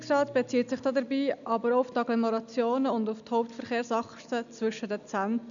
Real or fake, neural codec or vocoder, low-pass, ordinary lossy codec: real; none; 7.2 kHz; none